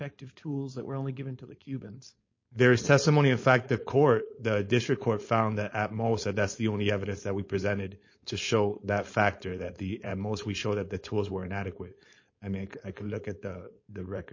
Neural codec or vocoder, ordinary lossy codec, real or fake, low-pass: codec, 16 kHz, 4.8 kbps, FACodec; MP3, 32 kbps; fake; 7.2 kHz